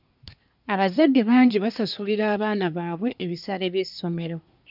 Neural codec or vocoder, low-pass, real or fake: codec, 24 kHz, 1 kbps, SNAC; 5.4 kHz; fake